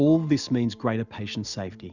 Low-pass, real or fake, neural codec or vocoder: 7.2 kHz; real; none